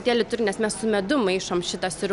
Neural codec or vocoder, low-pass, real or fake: none; 10.8 kHz; real